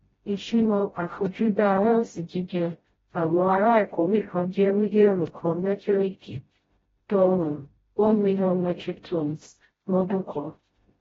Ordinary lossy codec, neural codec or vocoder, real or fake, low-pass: AAC, 24 kbps; codec, 16 kHz, 0.5 kbps, FreqCodec, smaller model; fake; 7.2 kHz